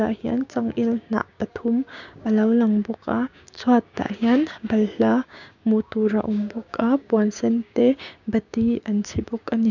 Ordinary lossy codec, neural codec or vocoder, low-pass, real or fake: none; codec, 16 kHz, 6 kbps, DAC; 7.2 kHz; fake